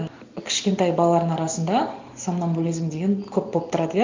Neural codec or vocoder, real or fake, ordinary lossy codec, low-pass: none; real; MP3, 64 kbps; 7.2 kHz